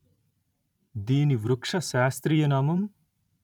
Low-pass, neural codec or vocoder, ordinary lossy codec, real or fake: 19.8 kHz; vocoder, 44.1 kHz, 128 mel bands every 512 samples, BigVGAN v2; none; fake